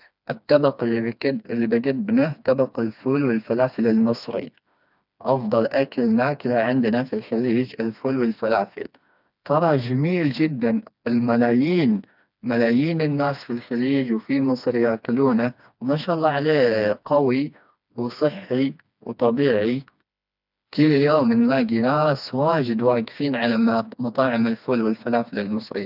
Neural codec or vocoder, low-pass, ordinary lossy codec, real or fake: codec, 16 kHz, 2 kbps, FreqCodec, smaller model; 5.4 kHz; none; fake